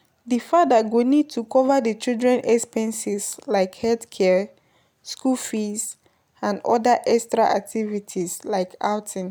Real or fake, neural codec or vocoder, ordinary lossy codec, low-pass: real; none; none; none